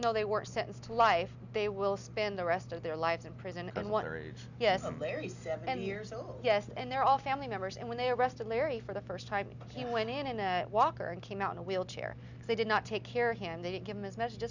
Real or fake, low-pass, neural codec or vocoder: real; 7.2 kHz; none